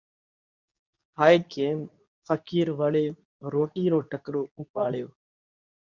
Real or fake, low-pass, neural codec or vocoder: fake; 7.2 kHz; codec, 24 kHz, 0.9 kbps, WavTokenizer, medium speech release version 2